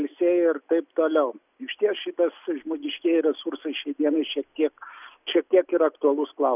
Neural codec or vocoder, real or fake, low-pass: none; real; 3.6 kHz